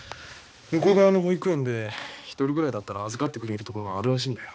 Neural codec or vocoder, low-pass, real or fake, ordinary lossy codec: codec, 16 kHz, 2 kbps, X-Codec, HuBERT features, trained on balanced general audio; none; fake; none